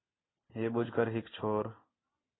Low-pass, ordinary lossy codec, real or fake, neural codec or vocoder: 7.2 kHz; AAC, 16 kbps; real; none